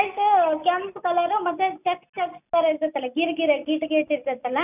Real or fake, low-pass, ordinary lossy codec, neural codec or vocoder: real; 3.6 kHz; none; none